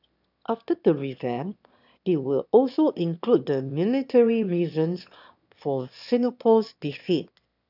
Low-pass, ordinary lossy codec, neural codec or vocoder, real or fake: 5.4 kHz; none; autoencoder, 22.05 kHz, a latent of 192 numbers a frame, VITS, trained on one speaker; fake